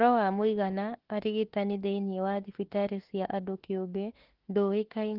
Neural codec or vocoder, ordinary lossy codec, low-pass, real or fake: autoencoder, 48 kHz, 32 numbers a frame, DAC-VAE, trained on Japanese speech; Opus, 16 kbps; 5.4 kHz; fake